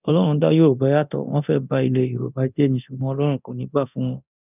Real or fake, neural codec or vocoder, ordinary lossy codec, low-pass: fake; codec, 24 kHz, 0.9 kbps, DualCodec; none; 3.6 kHz